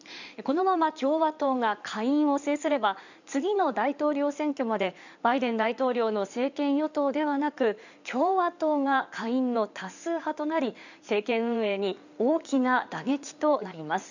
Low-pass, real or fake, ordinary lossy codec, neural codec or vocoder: 7.2 kHz; fake; none; codec, 16 kHz in and 24 kHz out, 2.2 kbps, FireRedTTS-2 codec